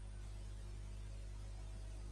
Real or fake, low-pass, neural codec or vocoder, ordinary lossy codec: real; 9.9 kHz; none; Opus, 32 kbps